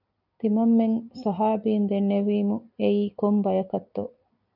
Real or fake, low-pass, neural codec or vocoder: real; 5.4 kHz; none